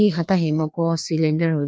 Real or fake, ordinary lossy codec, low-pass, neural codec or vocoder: fake; none; none; codec, 16 kHz, 2 kbps, FreqCodec, larger model